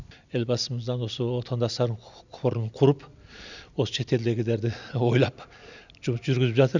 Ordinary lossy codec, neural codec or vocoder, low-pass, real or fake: none; none; 7.2 kHz; real